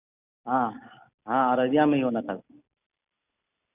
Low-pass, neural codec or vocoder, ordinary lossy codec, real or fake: 3.6 kHz; none; none; real